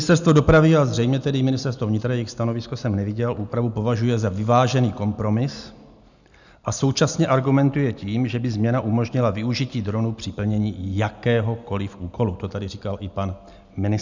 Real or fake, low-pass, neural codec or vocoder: real; 7.2 kHz; none